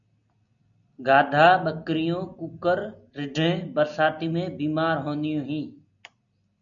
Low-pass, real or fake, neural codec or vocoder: 7.2 kHz; real; none